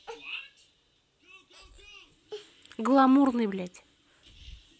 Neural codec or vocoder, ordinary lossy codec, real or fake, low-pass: none; none; real; none